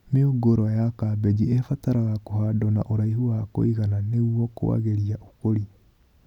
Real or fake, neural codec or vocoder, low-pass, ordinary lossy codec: real; none; 19.8 kHz; none